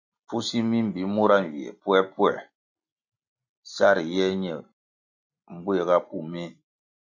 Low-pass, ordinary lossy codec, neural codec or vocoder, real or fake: 7.2 kHz; AAC, 48 kbps; none; real